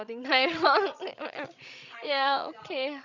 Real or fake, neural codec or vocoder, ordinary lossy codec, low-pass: fake; codec, 16 kHz, 16 kbps, FreqCodec, larger model; none; 7.2 kHz